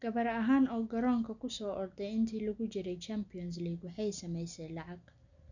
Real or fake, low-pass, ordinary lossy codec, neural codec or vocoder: real; 7.2 kHz; none; none